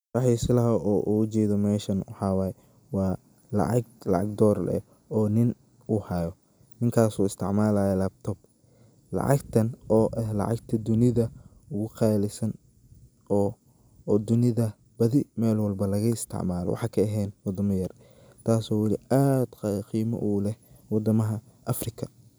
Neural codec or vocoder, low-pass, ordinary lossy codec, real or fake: none; none; none; real